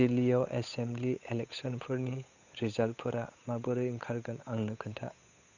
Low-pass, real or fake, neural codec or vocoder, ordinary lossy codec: 7.2 kHz; fake; codec, 16 kHz, 8 kbps, FunCodec, trained on Chinese and English, 25 frames a second; none